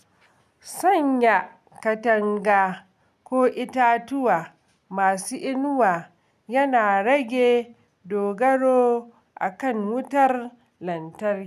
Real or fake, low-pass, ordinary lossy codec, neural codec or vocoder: real; 14.4 kHz; none; none